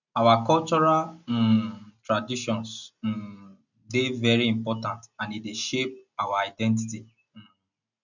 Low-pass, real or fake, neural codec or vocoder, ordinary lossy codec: 7.2 kHz; real; none; none